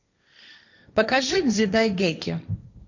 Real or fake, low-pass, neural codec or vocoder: fake; 7.2 kHz; codec, 16 kHz, 1.1 kbps, Voila-Tokenizer